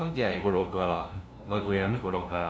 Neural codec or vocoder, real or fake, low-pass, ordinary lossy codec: codec, 16 kHz, 0.5 kbps, FunCodec, trained on LibriTTS, 25 frames a second; fake; none; none